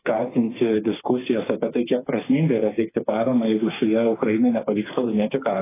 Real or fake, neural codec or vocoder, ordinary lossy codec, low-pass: fake; codec, 16 kHz, 4 kbps, FreqCodec, smaller model; AAC, 16 kbps; 3.6 kHz